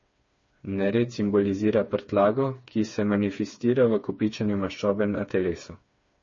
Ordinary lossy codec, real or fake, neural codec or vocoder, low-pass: MP3, 32 kbps; fake; codec, 16 kHz, 4 kbps, FreqCodec, smaller model; 7.2 kHz